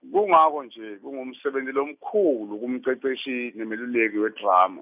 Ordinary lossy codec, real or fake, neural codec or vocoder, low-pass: none; real; none; 3.6 kHz